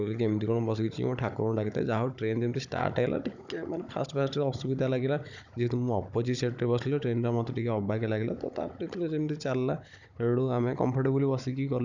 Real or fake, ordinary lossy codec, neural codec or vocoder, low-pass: fake; none; codec, 16 kHz, 16 kbps, FunCodec, trained on Chinese and English, 50 frames a second; 7.2 kHz